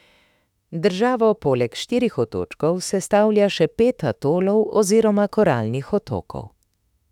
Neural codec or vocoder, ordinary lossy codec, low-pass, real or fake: autoencoder, 48 kHz, 32 numbers a frame, DAC-VAE, trained on Japanese speech; none; 19.8 kHz; fake